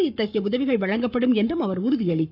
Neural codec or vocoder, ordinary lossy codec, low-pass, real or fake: codec, 44.1 kHz, 7.8 kbps, Pupu-Codec; none; 5.4 kHz; fake